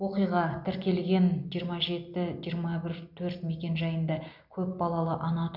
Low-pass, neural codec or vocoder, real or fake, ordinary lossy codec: 5.4 kHz; none; real; none